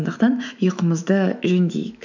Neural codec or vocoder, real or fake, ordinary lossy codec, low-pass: autoencoder, 48 kHz, 128 numbers a frame, DAC-VAE, trained on Japanese speech; fake; none; 7.2 kHz